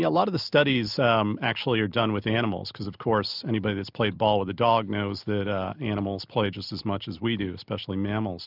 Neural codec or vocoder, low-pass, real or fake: none; 5.4 kHz; real